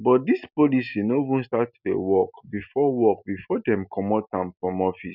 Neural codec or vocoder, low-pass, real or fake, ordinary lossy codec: none; 5.4 kHz; real; none